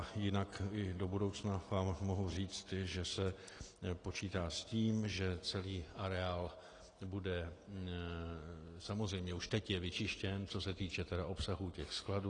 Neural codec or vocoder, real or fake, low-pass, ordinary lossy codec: none; real; 9.9 kHz; AAC, 32 kbps